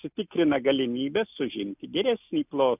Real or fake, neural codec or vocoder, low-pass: real; none; 3.6 kHz